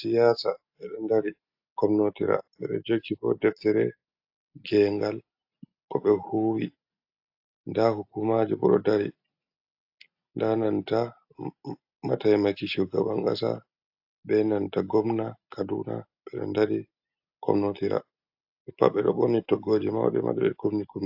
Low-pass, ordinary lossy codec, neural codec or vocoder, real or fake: 5.4 kHz; AAC, 48 kbps; none; real